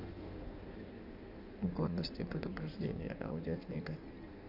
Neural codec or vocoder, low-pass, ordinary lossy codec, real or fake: codec, 16 kHz in and 24 kHz out, 1.1 kbps, FireRedTTS-2 codec; 5.4 kHz; none; fake